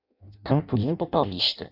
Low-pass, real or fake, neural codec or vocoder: 5.4 kHz; fake; codec, 16 kHz in and 24 kHz out, 0.6 kbps, FireRedTTS-2 codec